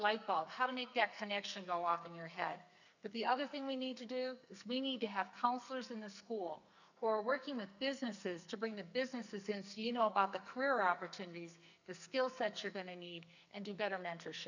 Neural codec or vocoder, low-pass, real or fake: codec, 44.1 kHz, 2.6 kbps, SNAC; 7.2 kHz; fake